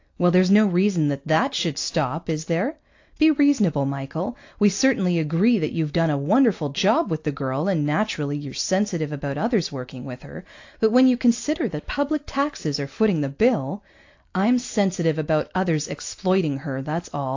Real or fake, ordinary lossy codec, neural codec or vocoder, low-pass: real; AAC, 48 kbps; none; 7.2 kHz